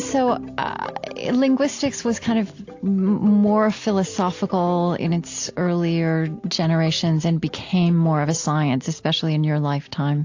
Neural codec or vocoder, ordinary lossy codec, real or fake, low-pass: none; AAC, 48 kbps; real; 7.2 kHz